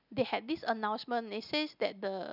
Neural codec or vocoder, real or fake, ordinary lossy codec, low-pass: none; real; none; 5.4 kHz